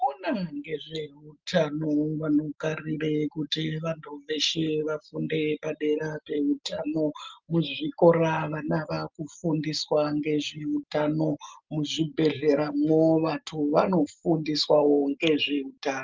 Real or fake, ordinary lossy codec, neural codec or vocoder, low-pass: real; Opus, 24 kbps; none; 7.2 kHz